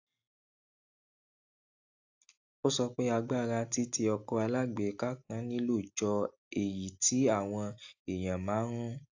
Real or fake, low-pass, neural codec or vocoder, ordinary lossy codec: real; 7.2 kHz; none; AAC, 48 kbps